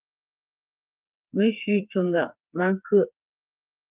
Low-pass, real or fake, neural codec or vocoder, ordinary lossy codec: 3.6 kHz; fake; codec, 16 kHz, 4 kbps, FreqCodec, smaller model; Opus, 24 kbps